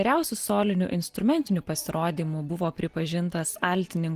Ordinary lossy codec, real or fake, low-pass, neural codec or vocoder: Opus, 24 kbps; real; 14.4 kHz; none